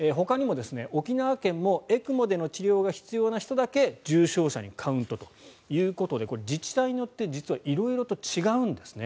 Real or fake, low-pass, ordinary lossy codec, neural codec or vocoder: real; none; none; none